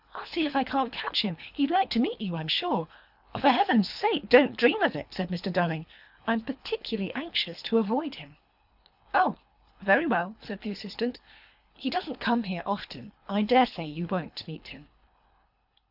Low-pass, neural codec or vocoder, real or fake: 5.4 kHz; codec, 24 kHz, 3 kbps, HILCodec; fake